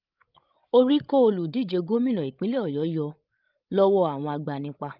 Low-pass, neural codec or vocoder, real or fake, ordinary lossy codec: 5.4 kHz; codec, 16 kHz, 16 kbps, FreqCodec, larger model; fake; Opus, 32 kbps